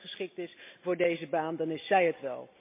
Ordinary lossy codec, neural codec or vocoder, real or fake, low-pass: MP3, 24 kbps; none; real; 3.6 kHz